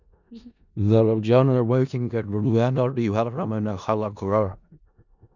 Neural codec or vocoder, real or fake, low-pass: codec, 16 kHz in and 24 kHz out, 0.4 kbps, LongCat-Audio-Codec, four codebook decoder; fake; 7.2 kHz